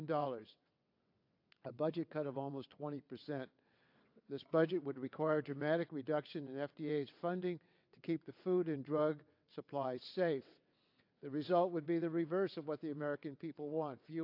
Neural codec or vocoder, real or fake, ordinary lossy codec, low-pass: vocoder, 22.05 kHz, 80 mel bands, WaveNeXt; fake; AAC, 48 kbps; 5.4 kHz